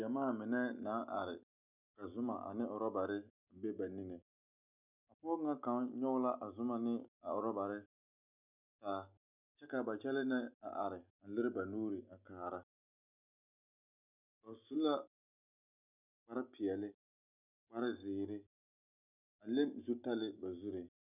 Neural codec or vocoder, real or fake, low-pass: none; real; 3.6 kHz